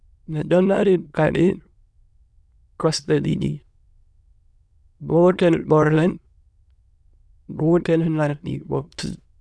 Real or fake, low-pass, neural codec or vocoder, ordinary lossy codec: fake; none; autoencoder, 22.05 kHz, a latent of 192 numbers a frame, VITS, trained on many speakers; none